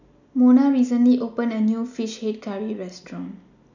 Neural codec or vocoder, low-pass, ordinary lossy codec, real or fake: none; 7.2 kHz; none; real